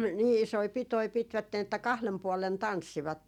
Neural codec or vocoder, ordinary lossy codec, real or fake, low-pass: none; none; real; 19.8 kHz